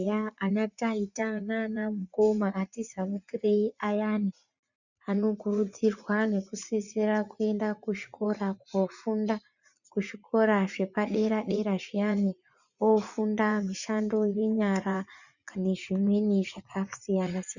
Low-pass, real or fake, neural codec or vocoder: 7.2 kHz; fake; vocoder, 44.1 kHz, 80 mel bands, Vocos